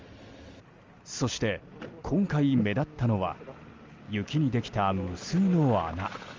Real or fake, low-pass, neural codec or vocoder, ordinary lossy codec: real; 7.2 kHz; none; Opus, 32 kbps